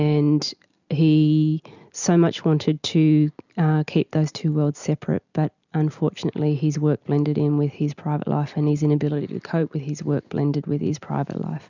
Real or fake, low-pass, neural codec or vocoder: real; 7.2 kHz; none